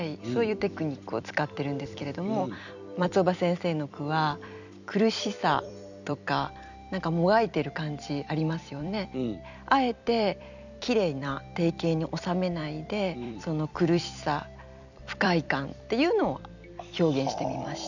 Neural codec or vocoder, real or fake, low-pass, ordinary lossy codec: vocoder, 44.1 kHz, 128 mel bands every 256 samples, BigVGAN v2; fake; 7.2 kHz; none